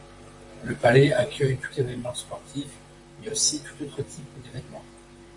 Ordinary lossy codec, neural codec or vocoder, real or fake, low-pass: AAC, 64 kbps; vocoder, 44.1 kHz, 128 mel bands, Pupu-Vocoder; fake; 10.8 kHz